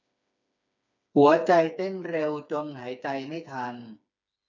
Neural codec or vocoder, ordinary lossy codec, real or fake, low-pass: codec, 16 kHz, 4 kbps, FreqCodec, smaller model; none; fake; 7.2 kHz